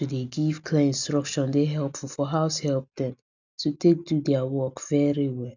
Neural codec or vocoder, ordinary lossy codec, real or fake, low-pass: none; none; real; 7.2 kHz